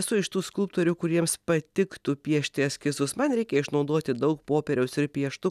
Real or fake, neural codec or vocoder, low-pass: real; none; 14.4 kHz